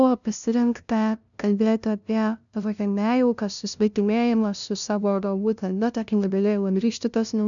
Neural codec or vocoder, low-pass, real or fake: codec, 16 kHz, 0.5 kbps, FunCodec, trained on LibriTTS, 25 frames a second; 7.2 kHz; fake